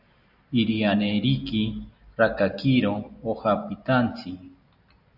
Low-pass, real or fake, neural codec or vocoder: 5.4 kHz; real; none